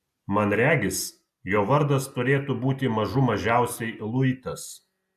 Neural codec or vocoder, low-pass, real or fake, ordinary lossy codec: none; 14.4 kHz; real; AAC, 96 kbps